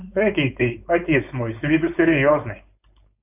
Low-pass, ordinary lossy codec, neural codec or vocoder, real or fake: 3.6 kHz; AAC, 24 kbps; codec, 16 kHz, 4.8 kbps, FACodec; fake